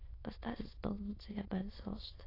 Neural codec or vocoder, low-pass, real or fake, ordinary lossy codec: autoencoder, 22.05 kHz, a latent of 192 numbers a frame, VITS, trained on many speakers; 5.4 kHz; fake; AAC, 24 kbps